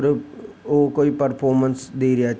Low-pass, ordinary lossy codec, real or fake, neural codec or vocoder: none; none; real; none